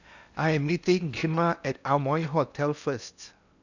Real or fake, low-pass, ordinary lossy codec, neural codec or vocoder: fake; 7.2 kHz; none; codec, 16 kHz in and 24 kHz out, 0.8 kbps, FocalCodec, streaming, 65536 codes